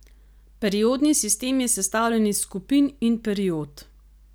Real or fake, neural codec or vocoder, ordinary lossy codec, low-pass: real; none; none; none